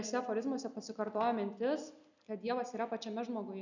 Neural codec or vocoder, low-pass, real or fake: none; 7.2 kHz; real